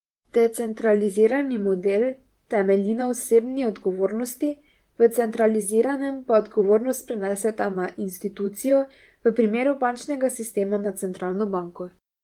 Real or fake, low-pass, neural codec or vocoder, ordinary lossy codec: fake; 19.8 kHz; vocoder, 44.1 kHz, 128 mel bands, Pupu-Vocoder; Opus, 24 kbps